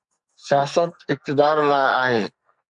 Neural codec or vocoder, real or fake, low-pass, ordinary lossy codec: codec, 44.1 kHz, 2.6 kbps, SNAC; fake; 10.8 kHz; MP3, 96 kbps